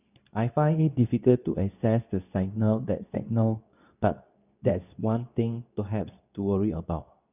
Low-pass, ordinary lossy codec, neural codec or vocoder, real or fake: 3.6 kHz; none; codec, 24 kHz, 0.9 kbps, WavTokenizer, medium speech release version 2; fake